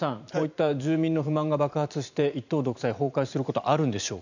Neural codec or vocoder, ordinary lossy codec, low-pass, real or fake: vocoder, 44.1 kHz, 128 mel bands every 512 samples, BigVGAN v2; none; 7.2 kHz; fake